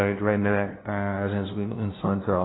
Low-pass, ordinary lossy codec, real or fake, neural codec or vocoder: 7.2 kHz; AAC, 16 kbps; fake; codec, 16 kHz, 0.5 kbps, FunCodec, trained on LibriTTS, 25 frames a second